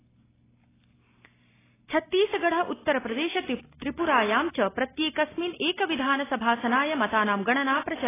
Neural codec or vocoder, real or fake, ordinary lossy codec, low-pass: none; real; AAC, 16 kbps; 3.6 kHz